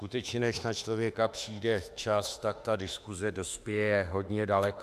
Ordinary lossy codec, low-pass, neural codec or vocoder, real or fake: AAC, 96 kbps; 14.4 kHz; autoencoder, 48 kHz, 32 numbers a frame, DAC-VAE, trained on Japanese speech; fake